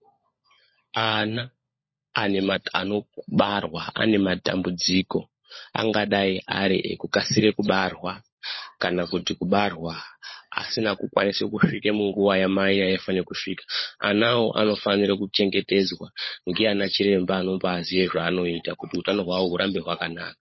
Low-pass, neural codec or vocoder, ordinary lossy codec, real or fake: 7.2 kHz; codec, 16 kHz, 16 kbps, FunCodec, trained on LibriTTS, 50 frames a second; MP3, 24 kbps; fake